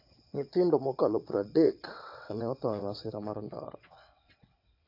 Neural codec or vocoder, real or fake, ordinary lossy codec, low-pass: vocoder, 22.05 kHz, 80 mel bands, WaveNeXt; fake; AAC, 32 kbps; 5.4 kHz